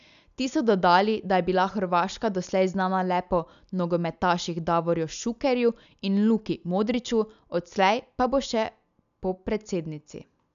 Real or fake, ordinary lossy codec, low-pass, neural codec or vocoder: real; none; 7.2 kHz; none